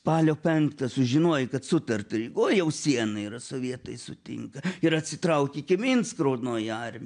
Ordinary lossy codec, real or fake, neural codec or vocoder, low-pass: MP3, 64 kbps; real; none; 9.9 kHz